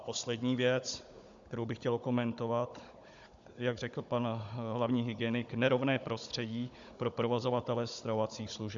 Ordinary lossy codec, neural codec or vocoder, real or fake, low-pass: AAC, 64 kbps; codec, 16 kHz, 16 kbps, FunCodec, trained on Chinese and English, 50 frames a second; fake; 7.2 kHz